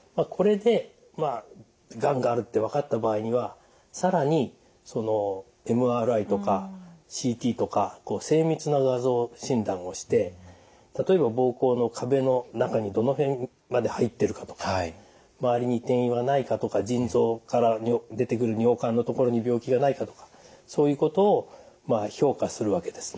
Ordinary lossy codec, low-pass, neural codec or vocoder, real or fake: none; none; none; real